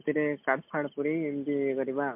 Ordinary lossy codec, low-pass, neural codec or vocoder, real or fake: MP3, 32 kbps; 3.6 kHz; none; real